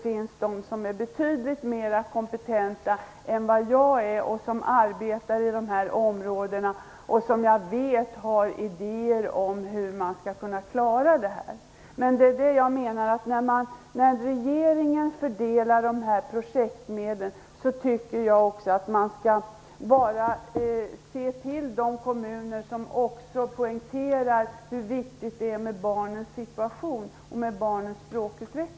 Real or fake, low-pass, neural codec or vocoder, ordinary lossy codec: real; none; none; none